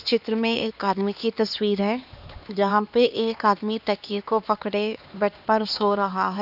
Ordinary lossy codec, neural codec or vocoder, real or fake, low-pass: none; codec, 16 kHz, 2 kbps, X-Codec, HuBERT features, trained on LibriSpeech; fake; 5.4 kHz